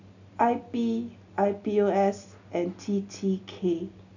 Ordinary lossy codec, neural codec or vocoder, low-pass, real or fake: none; none; 7.2 kHz; real